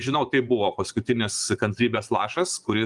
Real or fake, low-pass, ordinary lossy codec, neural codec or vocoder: fake; 10.8 kHz; Opus, 32 kbps; vocoder, 24 kHz, 100 mel bands, Vocos